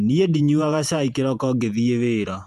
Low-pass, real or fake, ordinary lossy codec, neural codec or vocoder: 14.4 kHz; fake; none; vocoder, 48 kHz, 128 mel bands, Vocos